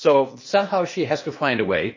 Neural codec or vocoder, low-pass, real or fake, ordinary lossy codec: codec, 16 kHz, 0.8 kbps, ZipCodec; 7.2 kHz; fake; MP3, 32 kbps